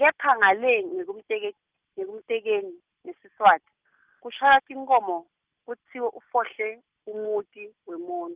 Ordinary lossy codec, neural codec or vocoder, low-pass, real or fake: Opus, 24 kbps; none; 3.6 kHz; real